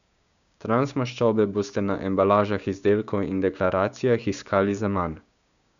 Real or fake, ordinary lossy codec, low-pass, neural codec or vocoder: fake; none; 7.2 kHz; codec, 16 kHz, 6 kbps, DAC